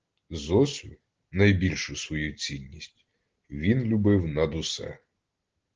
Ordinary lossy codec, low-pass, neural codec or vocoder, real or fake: Opus, 16 kbps; 7.2 kHz; none; real